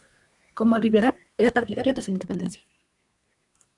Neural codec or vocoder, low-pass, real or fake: codec, 24 kHz, 1 kbps, SNAC; 10.8 kHz; fake